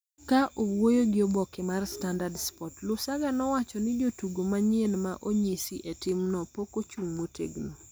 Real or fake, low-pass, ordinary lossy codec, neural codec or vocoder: real; none; none; none